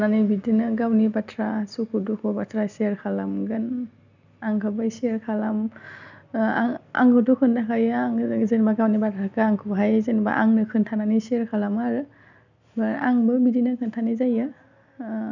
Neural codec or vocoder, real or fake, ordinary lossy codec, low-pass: none; real; none; 7.2 kHz